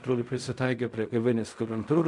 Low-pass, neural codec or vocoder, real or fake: 10.8 kHz; codec, 16 kHz in and 24 kHz out, 0.4 kbps, LongCat-Audio-Codec, fine tuned four codebook decoder; fake